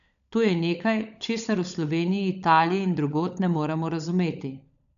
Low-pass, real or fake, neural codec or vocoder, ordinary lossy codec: 7.2 kHz; fake; codec, 16 kHz, 16 kbps, FunCodec, trained on LibriTTS, 50 frames a second; none